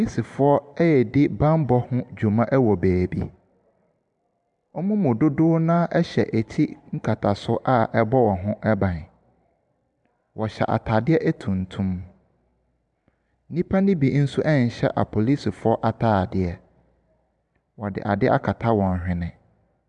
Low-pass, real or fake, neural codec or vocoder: 10.8 kHz; fake; vocoder, 44.1 kHz, 128 mel bands every 512 samples, BigVGAN v2